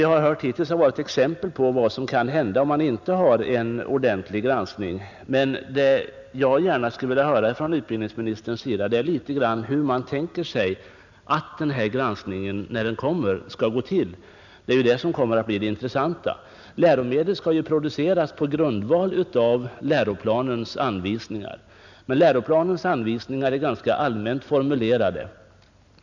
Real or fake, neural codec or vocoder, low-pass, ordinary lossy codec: real; none; 7.2 kHz; none